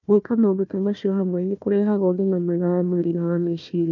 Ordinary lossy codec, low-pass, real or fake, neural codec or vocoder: none; 7.2 kHz; fake; codec, 16 kHz, 1 kbps, FunCodec, trained on Chinese and English, 50 frames a second